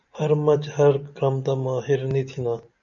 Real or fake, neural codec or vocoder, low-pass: real; none; 7.2 kHz